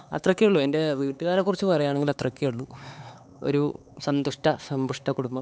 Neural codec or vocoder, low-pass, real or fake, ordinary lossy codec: codec, 16 kHz, 4 kbps, X-Codec, HuBERT features, trained on LibriSpeech; none; fake; none